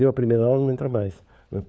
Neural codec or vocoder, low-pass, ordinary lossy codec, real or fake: codec, 16 kHz, 4 kbps, FunCodec, trained on LibriTTS, 50 frames a second; none; none; fake